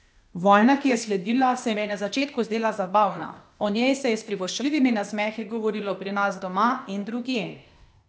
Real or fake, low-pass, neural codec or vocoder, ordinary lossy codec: fake; none; codec, 16 kHz, 0.8 kbps, ZipCodec; none